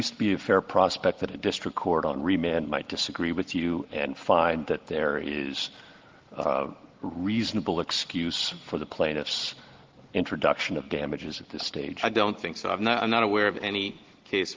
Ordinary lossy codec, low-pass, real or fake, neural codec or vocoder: Opus, 16 kbps; 7.2 kHz; real; none